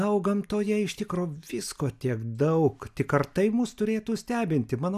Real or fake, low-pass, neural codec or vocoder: real; 14.4 kHz; none